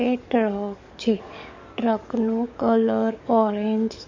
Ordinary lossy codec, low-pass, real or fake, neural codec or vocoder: MP3, 48 kbps; 7.2 kHz; fake; codec, 44.1 kHz, 7.8 kbps, DAC